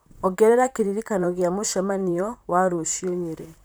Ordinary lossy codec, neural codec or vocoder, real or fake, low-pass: none; vocoder, 44.1 kHz, 128 mel bands, Pupu-Vocoder; fake; none